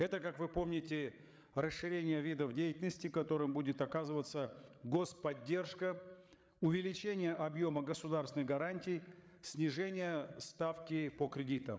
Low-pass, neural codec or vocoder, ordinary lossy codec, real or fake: none; codec, 16 kHz, 8 kbps, FreqCodec, larger model; none; fake